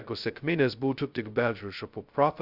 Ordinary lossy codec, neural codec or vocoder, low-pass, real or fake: Opus, 64 kbps; codec, 16 kHz, 0.2 kbps, FocalCodec; 5.4 kHz; fake